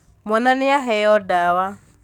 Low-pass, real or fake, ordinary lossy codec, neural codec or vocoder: 19.8 kHz; fake; none; codec, 44.1 kHz, 7.8 kbps, DAC